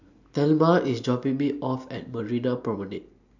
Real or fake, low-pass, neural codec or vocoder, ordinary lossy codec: real; 7.2 kHz; none; none